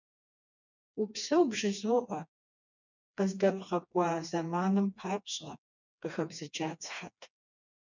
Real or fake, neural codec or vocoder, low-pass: fake; codec, 16 kHz, 2 kbps, FreqCodec, smaller model; 7.2 kHz